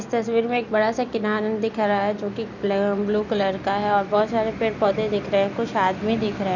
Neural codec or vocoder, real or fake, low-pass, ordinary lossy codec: none; real; 7.2 kHz; none